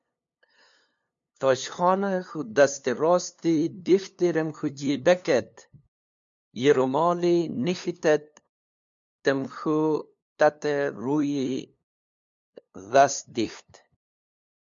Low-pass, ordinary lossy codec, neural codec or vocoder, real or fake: 7.2 kHz; MP3, 64 kbps; codec, 16 kHz, 2 kbps, FunCodec, trained on LibriTTS, 25 frames a second; fake